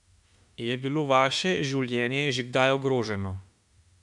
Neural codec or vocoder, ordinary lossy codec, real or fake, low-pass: autoencoder, 48 kHz, 32 numbers a frame, DAC-VAE, trained on Japanese speech; MP3, 96 kbps; fake; 10.8 kHz